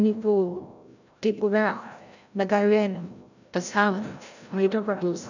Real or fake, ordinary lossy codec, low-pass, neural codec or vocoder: fake; none; 7.2 kHz; codec, 16 kHz, 0.5 kbps, FreqCodec, larger model